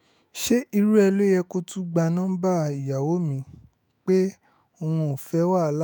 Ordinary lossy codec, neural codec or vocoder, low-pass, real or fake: none; autoencoder, 48 kHz, 128 numbers a frame, DAC-VAE, trained on Japanese speech; none; fake